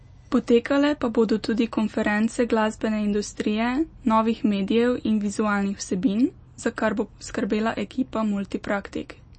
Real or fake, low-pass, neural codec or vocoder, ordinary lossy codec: real; 10.8 kHz; none; MP3, 32 kbps